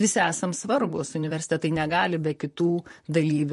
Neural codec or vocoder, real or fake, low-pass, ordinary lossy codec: vocoder, 44.1 kHz, 128 mel bands, Pupu-Vocoder; fake; 14.4 kHz; MP3, 48 kbps